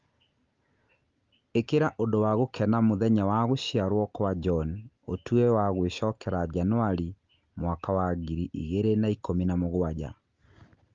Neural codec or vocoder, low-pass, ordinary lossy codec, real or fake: none; 7.2 kHz; Opus, 32 kbps; real